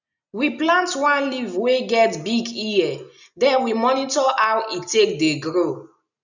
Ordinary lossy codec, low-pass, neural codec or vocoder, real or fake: none; 7.2 kHz; none; real